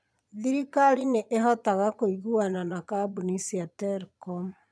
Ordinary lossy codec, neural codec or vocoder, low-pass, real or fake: none; vocoder, 22.05 kHz, 80 mel bands, Vocos; none; fake